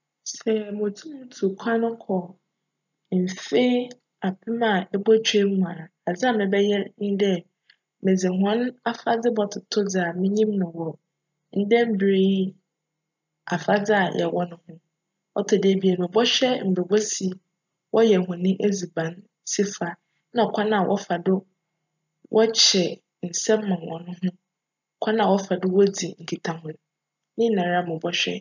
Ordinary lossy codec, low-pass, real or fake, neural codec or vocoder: none; 7.2 kHz; real; none